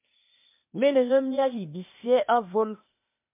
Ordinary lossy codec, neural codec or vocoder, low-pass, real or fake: MP3, 32 kbps; codec, 16 kHz, 0.8 kbps, ZipCodec; 3.6 kHz; fake